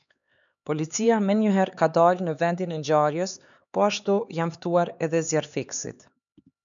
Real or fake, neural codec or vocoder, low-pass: fake; codec, 16 kHz, 4 kbps, X-Codec, HuBERT features, trained on LibriSpeech; 7.2 kHz